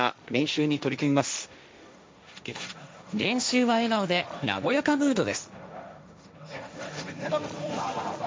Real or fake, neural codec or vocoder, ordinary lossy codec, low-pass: fake; codec, 16 kHz, 1.1 kbps, Voila-Tokenizer; none; none